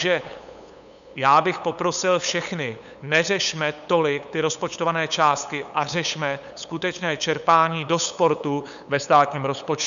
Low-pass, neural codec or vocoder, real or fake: 7.2 kHz; codec, 16 kHz, 8 kbps, FunCodec, trained on LibriTTS, 25 frames a second; fake